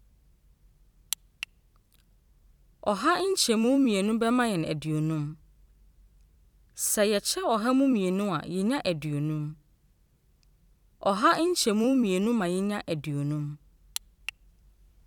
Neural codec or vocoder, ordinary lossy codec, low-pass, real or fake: vocoder, 44.1 kHz, 128 mel bands every 256 samples, BigVGAN v2; none; 19.8 kHz; fake